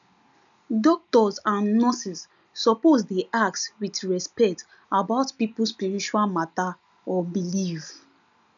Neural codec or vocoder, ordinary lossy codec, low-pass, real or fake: none; none; 7.2 kHz; real